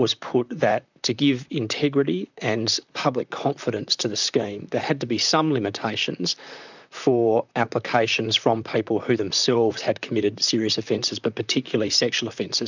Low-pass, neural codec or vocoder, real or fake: 7.2 kHz; vocoder, 44.1 kHz, 128 mel bands, Pupu-Vocoder; fake